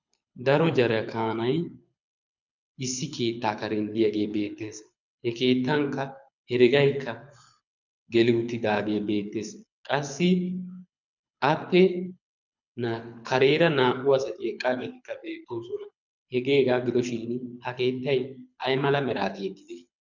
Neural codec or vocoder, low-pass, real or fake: codec, 24 kHz, 6 kbps, HILCodec; 7.2 kHz; fake